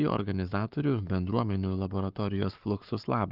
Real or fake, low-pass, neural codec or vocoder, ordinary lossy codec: fake; 5.4 kHz; codec, 44.1 kHz, 7.8 kbps, Pupu-Codec; Opus, 32 kbps